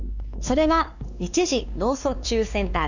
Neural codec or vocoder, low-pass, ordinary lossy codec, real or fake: codec, 16 kHz, 2 kbps, X-Codec, WavLM features, trained on Multilingual LibriSpeech; 7.2 kHz; none; fake